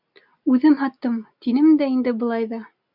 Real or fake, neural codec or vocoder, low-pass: real; none; 5.4 kHz